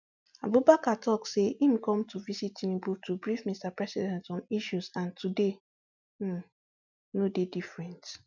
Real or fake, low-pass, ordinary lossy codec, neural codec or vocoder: real; 7.2 kHz; none; none